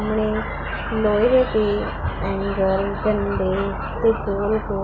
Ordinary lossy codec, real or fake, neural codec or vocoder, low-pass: none; real; none; 7.2 kHz